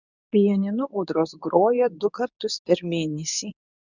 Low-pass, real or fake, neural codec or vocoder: 7.2 kHz; fake; codec, 24 kHz, 0.9 kbps, WavTokenizer, medium speech release version 2